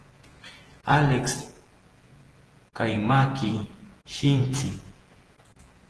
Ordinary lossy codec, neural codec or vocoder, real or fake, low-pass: Opus, 16 kbps; vocoder, 48 kHz, 128 mel bands, Vocos; fake; 10.8 kHz